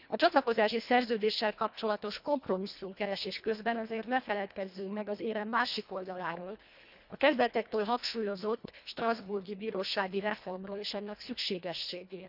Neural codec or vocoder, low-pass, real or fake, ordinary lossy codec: codec, 24 kHz, 1.5 kbps, HILCodec; 5.4 kHz; fake; none